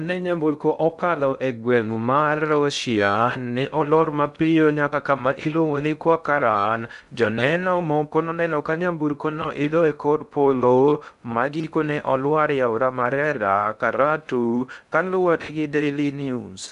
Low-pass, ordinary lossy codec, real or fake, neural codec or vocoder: 10.8 kHz; none; fake; codec, 16 kHz in and 24 kHz out, 0.6 kbps, FocalCodec, streaming, 2048 codes